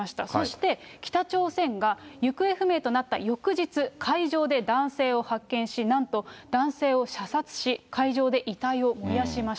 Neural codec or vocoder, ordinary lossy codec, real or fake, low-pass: none; none; real; none